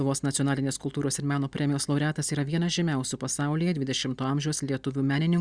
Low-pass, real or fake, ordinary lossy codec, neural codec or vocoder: 9.9 kHz; real; Opus, 64 kbps; none